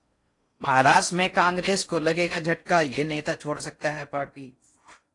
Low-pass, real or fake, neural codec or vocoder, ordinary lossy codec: 10.8 kHz; fake; codec, 16 kHz in and 24 kHz out, 0.6 kbps, FocalCodec, streaming, 4096 codes; AAC, 48 kbps